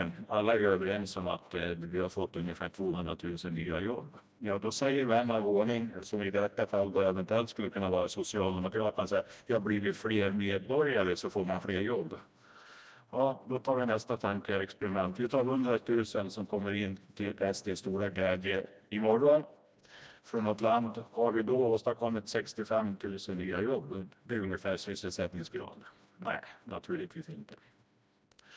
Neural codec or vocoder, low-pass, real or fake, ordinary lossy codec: codec, 16 kHz, 1 kbps, FreqCodec, smaller model; none; fake; none